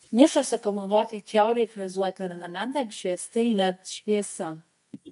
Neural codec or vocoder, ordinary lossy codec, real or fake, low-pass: codec, 24 kHz, 0.9 kbps, WavTokenizer, medium music audio release; MP3, 64 kbps; fake; 10.8 kHz